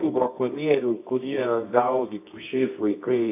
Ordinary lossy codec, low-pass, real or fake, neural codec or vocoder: AAC, 32 kbps; 3.6 kHz; fake; codec, 24 kHz, 0.9 kbps, WavTokenizer, medium music audio release